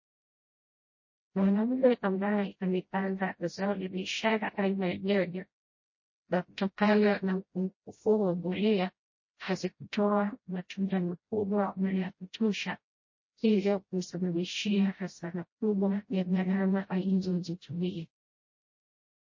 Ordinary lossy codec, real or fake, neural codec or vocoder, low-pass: MP3, 32 kbps; fake; codec, 16 kHz, 0.5 kbps, FreqCodec, smaller model; 7.2 kHz